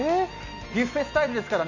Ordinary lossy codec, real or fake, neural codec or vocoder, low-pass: none; real; none; 7.2 kHz